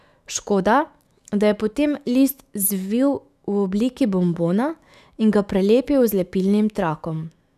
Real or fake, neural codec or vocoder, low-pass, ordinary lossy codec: fake; autoencoder, 48 kHz, 128 numbers a frame, DAC-VAE, trained on Japanese speech; 14.4 kHz; none